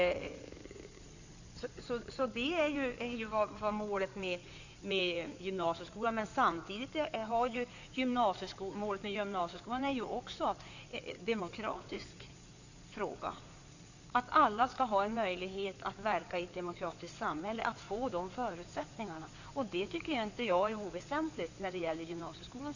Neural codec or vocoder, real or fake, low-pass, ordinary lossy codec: codec, 16 kHz in and 24 kHz out, 2.2 kbps, FireRedTTS-2 codec; fake; 7.2 kHz; none